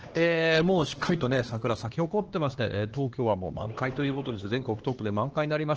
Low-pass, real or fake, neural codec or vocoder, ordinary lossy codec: 7.2 kHz; fake; codec, 16 kHz, 2 kbps, X-Codec, HuBERT features, trained on LibriSpeech; Opus, 16 kbps